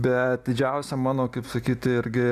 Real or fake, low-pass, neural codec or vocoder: real; 14.4 kHz; none